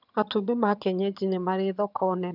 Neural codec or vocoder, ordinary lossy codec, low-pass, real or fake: vocoder, 22.05 kHz, 80 mel bands, HiFi-GAN; none; 5.4 kHz; fake